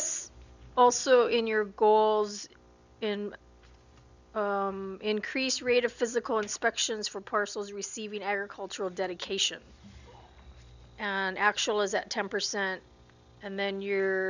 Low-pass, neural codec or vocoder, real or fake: 7.2 kHz; none; real